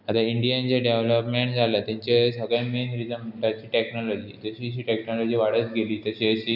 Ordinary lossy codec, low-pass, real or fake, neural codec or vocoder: none; 5.4 kHz; real; none